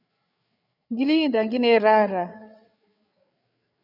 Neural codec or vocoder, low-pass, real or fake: codec, 16 kHz, 8 kbps, FreqCodec, larger model; 5.4 kHz; fake